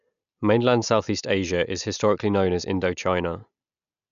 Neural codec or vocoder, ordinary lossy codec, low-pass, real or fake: none; none; 7.2 kHz; real